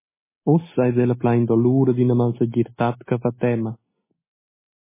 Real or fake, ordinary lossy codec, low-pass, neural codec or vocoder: real; MP3, 16 kbps; 3.6 kHz; none